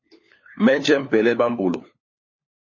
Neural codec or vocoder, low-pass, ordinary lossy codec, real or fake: codec, 16 kHz, 4 kbps, FunCodec, trained on LibriTTS, 50 frames a second; 7.2 kHz; MP3, 32 kbps; fake